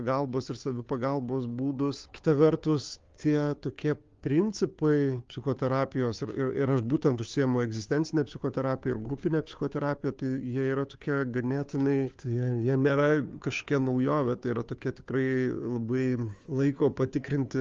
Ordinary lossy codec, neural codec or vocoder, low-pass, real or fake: Opus, 32 kbps; codec, 16 kHz, 2 kbps, FunCodec, trained on Chinese and English, 25 frames a second; 7.2 kHz; fake